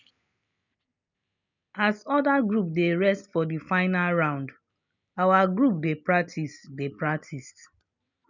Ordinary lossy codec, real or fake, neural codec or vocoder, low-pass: none; real; none; 7.2 kHz